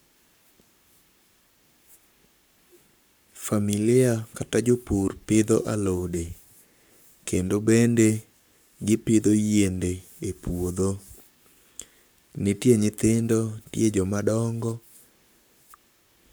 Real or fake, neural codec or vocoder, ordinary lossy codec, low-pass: fake; codec, 44.1 kHz, 7.8 kbps, Pupu-Codec; none; none